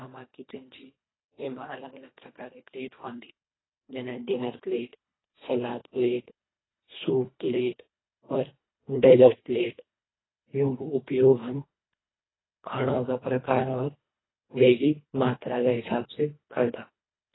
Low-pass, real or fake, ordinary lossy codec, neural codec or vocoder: 7.2 kHz; fake; AAC, 16 kbps; codec, 24 kHz, 1.5 kbps, HILCodec